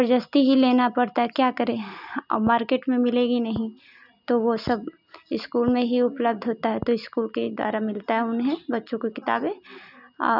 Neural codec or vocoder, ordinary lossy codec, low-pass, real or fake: none; none; 5.4 kHz; real